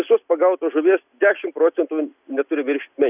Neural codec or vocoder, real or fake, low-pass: none; real; 3.6 kHz